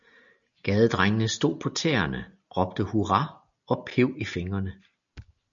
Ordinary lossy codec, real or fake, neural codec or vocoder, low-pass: MP3, 96 kbps; real; none; 7.2 kHz